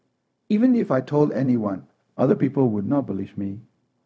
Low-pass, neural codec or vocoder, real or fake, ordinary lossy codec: none; codec, 16 kHz, 0.4 kbps, LongCat-Audio-Codec; fake; none